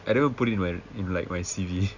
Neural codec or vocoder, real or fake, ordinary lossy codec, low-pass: none; real; Opus, 64 kbps; 7.2 kHz